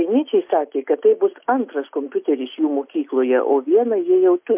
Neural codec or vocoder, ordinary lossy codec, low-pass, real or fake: none; MP3, 32 kbps; 3.6 kHz; real